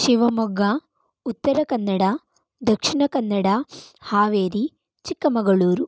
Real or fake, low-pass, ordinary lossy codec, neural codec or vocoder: real; none; none; none